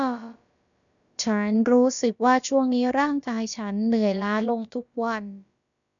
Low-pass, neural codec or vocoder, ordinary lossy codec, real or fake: 7.2 kHz; codec, 16 kHz, about 1 kbps, DyCAST, with the encoder's durations; none; fake